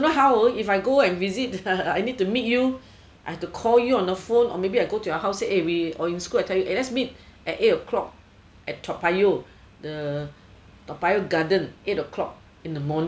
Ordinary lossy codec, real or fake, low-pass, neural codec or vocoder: none; real; none; none